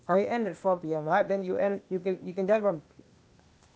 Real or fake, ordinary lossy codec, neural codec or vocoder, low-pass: fake; none; codec, 16 kHz, 0.8 kbps, ZipCodec; none